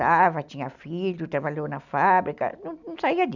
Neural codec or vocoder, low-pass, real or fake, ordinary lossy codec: none; 7.2 kHz; real; none